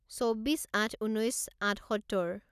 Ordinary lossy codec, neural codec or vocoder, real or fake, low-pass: none; none; real; 14.4 kHz